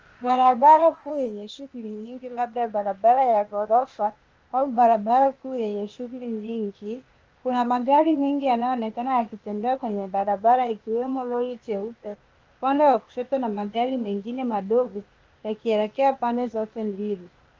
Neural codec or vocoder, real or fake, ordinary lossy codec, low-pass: codec, 16 kHz, 0.8 kbps, ZipCodec; fake; Opus, 24 kbps; 7.2 kHz